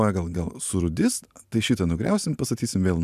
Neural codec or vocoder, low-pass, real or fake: none; 14.4 kHz; real